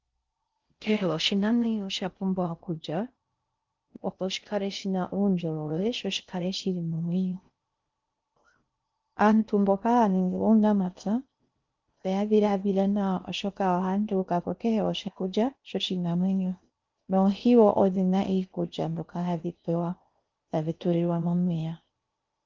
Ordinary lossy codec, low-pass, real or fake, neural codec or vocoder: Opus, 32 kbps; 7.2 kHz; fake; codec, 16 kHz in and 24 kHz out, 0.6 kbps, FocalCodec, streaming, 4096 codes